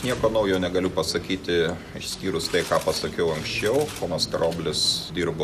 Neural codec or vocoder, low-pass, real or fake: none; 14.4 kHz; real